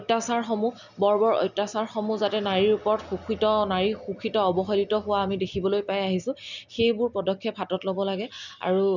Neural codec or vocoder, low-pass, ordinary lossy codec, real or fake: none; 7.2 kHz; none; real